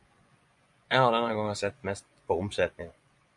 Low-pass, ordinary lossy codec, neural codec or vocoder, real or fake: 10.8 kHz; MP3, 96 kbps; vocoder, 24 kHz, 100 mel bands, Vocos; fake